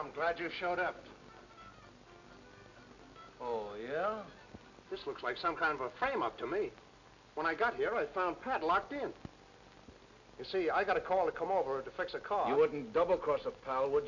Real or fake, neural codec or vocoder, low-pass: real; none; 7.2 kHz